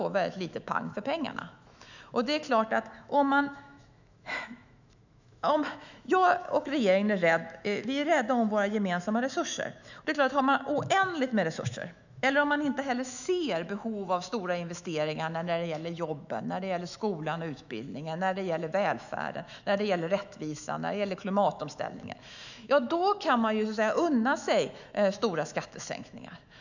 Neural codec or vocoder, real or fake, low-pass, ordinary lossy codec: autoencoder, 48 kHz, 128 numbers a frame, DAC-VAE, trained on Japanese speech; fake; 7.2 kHz; none